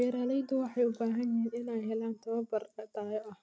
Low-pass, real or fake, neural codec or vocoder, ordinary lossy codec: none; real; none; none